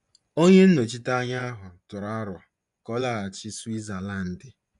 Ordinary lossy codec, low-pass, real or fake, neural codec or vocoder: none; 10.8 kHz; fake; vocoder, 24 kHz, 100 mel bands, Vocos